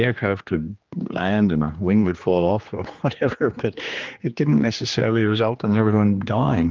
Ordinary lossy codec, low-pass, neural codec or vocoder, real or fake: Opus, 32 kbps; 7.2 kHz; codec, 16 kHz, 2 kbps, X-Codec, HuBERT features, trained on general audio; fake